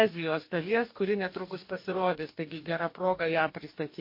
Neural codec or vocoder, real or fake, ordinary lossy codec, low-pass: codec, 44.1 kHz, 2.6 kbps, DAC; fake; MP3, 32 kbps; 5.4 kHz